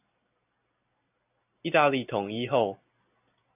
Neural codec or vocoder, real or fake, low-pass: none; real; 3.6 kHz